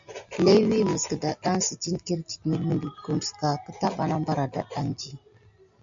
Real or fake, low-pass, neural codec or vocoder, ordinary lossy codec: real; 7.2 kHz; none; MP3, 64 kbps